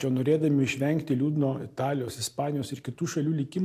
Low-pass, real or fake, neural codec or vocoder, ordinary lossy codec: 14.4 kHz; real; none; AAC, 64 kbps